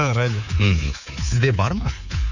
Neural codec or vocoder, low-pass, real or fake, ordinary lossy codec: codec, 24 kHz, 3.1 kbps, DualCodec; 7.2 kHz; fake; none